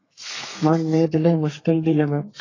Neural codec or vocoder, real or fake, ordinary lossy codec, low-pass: codec, 32 kHz, 1.9 kbps, SNAC; fake; AAC, 32 kbps; 7.2 kHz